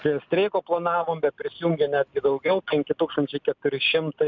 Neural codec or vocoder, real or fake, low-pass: none; real; 7.2 kHz